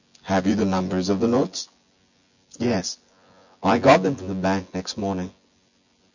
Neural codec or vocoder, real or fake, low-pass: vocoder, 24 kHz, 100 mel bands, Vocos; fake; 7.2 kHz